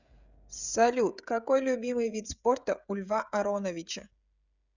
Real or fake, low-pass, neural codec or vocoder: fake; 7.2 kHz; codec, 16 kHz, 16 kbps, FreqCodec, smaller model